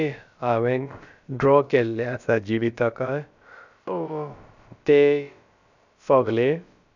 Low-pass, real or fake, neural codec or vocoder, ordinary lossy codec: 7.2 kHz; fake; codec, 16 kHz, about 1 kbps, DyCAST, with the encoder's durations; none